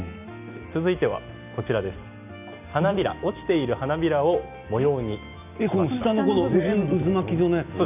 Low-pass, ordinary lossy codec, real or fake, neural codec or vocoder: 3.6 kHz; none; real; none